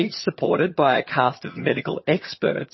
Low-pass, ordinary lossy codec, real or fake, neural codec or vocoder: 7.2 kHz; MP3, 24 kbps; fake; vocoder, 22.05 kHz, 80 mel bands, HiFi-GAN